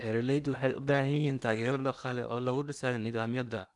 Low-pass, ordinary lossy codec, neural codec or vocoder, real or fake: 10.8 kHz; none; codec, 16 kHz in and 24 kHz out, 0.8 kbps, FocalCodec, streaming, 65536 codes; fake